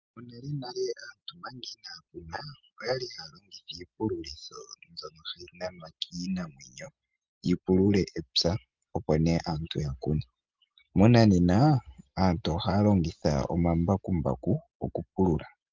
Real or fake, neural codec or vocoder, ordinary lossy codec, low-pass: real; none; Opus, 32 kbps; 7.2 kHz